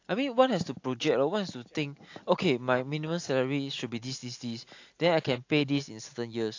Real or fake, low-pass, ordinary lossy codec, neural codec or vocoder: real; 7.2 kHz; AAC, 48 kbps; none